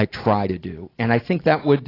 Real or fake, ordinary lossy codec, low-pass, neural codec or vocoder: real; AAC, 24 kbps; 5.4 kHz; none